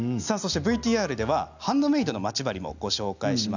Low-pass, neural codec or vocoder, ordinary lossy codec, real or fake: 7.2 kHz; none; none; real